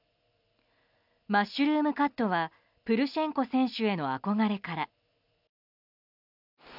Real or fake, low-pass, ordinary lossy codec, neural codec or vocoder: real; 5.4 kHz; none; none